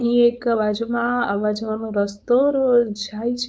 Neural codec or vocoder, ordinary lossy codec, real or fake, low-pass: codec, 16 kHz, 4.8 kbps, FACodec; none; fake; none